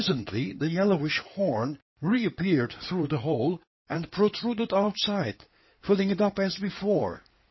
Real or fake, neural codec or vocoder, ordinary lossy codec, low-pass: fake; codec, 16 kHz in and 24 kHz out, 2.2 kbps, FireRedTTS-2 codec; MP3, 24 kbps; 7.2 kHz